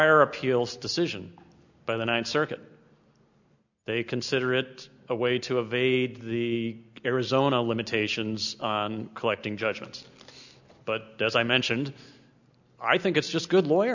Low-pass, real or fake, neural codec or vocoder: 7.2 kHz; real; none